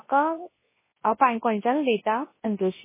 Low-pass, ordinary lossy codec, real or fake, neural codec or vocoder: 3.6 kHz; MP3, 16 kbps; fake; codec, 24 kHz, 0.9 kbps, WavTokenizer, large speech release